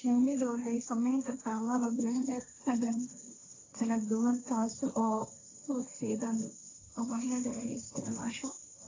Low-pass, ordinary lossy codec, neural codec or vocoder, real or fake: 7.2 kHz; AAC, 32 kbps; codec, 16 kHz, 1.1 kbps, Voila-Tokenizer; fake